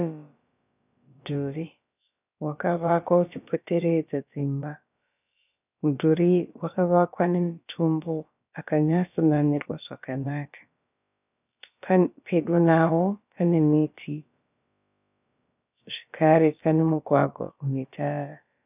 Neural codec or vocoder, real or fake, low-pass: codec, 16 kHz, about 1 kbps, DyCAST, with the encoder's durations; fake; 3.6 kHz